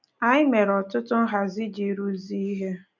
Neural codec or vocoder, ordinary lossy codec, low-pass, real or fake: none; none; 7.2 kHz; real